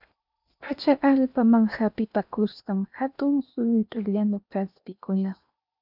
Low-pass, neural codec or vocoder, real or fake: 5.4 kHz; codec, 16 kHz in and 24 kHz out, 0.8 kbps, FocalCodec, streaming, 65536 codes; fake